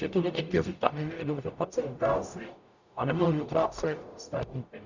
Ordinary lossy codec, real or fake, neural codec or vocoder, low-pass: Opus, 64 kbps; fake; codec, 44.1 kHz, 0.9 kbps, DAC; 7.2 kHz